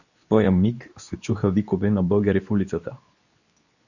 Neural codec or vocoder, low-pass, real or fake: codec, 24 kHz, 0.9 kbps, WavTokenizer, medium speech release version 2; 7.2 kHz; fake